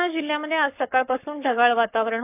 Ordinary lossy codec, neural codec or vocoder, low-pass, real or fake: none; codec, 44.1 kHz, 7.8 kbps, Pupu-Codec; 3.6 kHz; fake